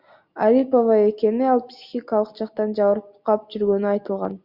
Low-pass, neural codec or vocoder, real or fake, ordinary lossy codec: 5.4 kHz; none; real; Opus, 64 kbps